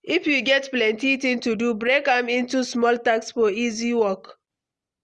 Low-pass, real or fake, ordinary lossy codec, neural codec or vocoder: 10.8 kHz; real; Opus, 32 kbps; none